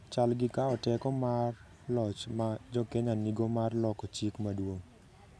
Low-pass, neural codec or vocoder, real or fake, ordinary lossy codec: none; none; real; none